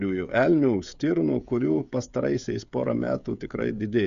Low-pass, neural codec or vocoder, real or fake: 7.2 kHz; codec, 16 kHz, 16 kbps, FreqCodec, smaller model; fake